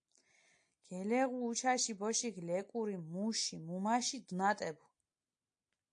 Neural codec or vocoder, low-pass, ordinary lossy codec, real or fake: none; 9.9 kHz; AAC, 64 kbps; real